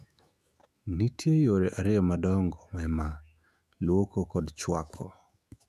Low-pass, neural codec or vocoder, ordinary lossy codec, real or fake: 14.4 kHz; autoencoder, 48 kHz, 128 numbers a frame, DAC-VAE, trained on Japanese speech; none; fake